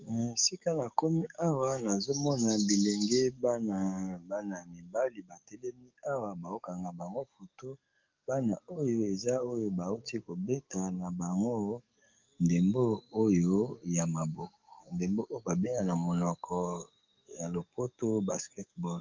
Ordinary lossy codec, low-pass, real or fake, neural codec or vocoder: Opus, 24 kbps; 7.2 kHz; fake; codec, 44.1 kHz, 7.8 kbps, DAC